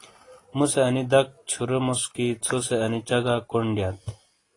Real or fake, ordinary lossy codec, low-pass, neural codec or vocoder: real; AAC, 32 kbps; 10.8 kHz; none